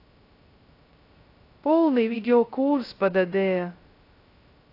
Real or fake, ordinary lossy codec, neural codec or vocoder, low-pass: fake; AAC, 32 kbps; codec, 16 kHz, 0.2 kbps, FocalCodec; 5.4 kHz